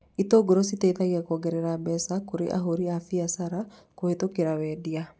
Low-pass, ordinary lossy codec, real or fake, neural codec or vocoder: none; none; real; none